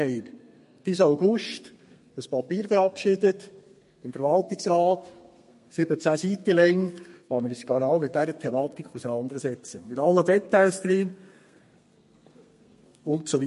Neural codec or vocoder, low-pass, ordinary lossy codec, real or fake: codec, 32 kHz, 1.9 kbps, SNAC; 14.4 kHz; MP3, 48 kbps; fake